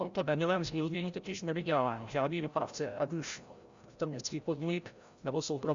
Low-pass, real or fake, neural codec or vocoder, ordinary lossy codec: 7.2 kHz; fake; codec, 16 kHz, 0.5 kbps, FreqCodec, larger model; Opus, 64 kbps